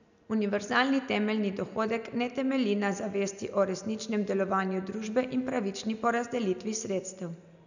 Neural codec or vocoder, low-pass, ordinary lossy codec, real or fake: none; 7.2 kHz; none; real